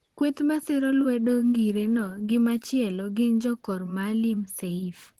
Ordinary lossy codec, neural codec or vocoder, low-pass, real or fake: Opus, 16 kbps; vocoder, 44.1 kHz, 128 mel bands, Pupu-Vocoder; 19.8 kHz; fake